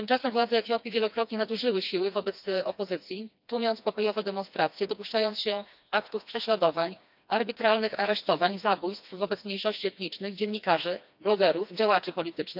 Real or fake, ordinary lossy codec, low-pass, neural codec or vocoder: fake; none; 5.4 kHz; codec, 16 kHz, 2 kbps, FreqCodec, smaller model